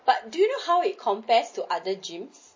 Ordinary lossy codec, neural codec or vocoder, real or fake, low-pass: MP3, 32 kbps; none; real; 7.2 kHz